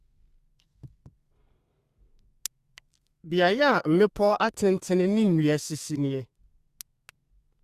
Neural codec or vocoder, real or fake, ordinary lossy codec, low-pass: codec, 32 kHz, 1.9 kbps, SNAC; fake; Opus, 64 kbps; 14.4 kHz